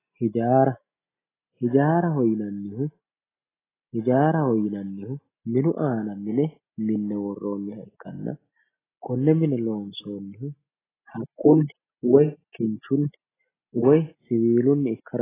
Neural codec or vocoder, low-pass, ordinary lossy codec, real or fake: none; 3.6 kHz; AAC, 16 kbps; real